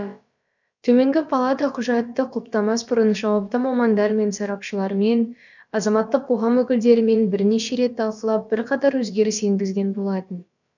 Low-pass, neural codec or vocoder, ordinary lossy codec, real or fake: 7.2 kHz; codec, 16 kHz, about 1 kbps, DyCAST, with the encoder's durations; none; fake